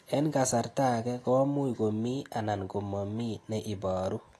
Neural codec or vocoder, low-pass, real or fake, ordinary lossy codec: none; 14.4 kHz; real; AAC, 48 kbps